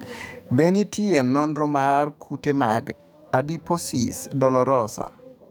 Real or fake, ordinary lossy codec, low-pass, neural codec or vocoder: fake; none; none; codec, 44.1 kHz, 2.6 kbps, SNAC